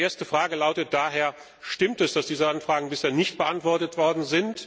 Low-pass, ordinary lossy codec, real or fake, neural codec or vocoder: none; none; real; none